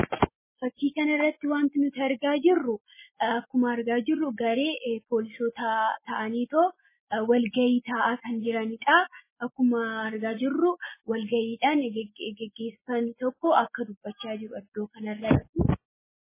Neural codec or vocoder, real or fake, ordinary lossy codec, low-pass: none; real; MP3, 16 kbps; 3.6 kHz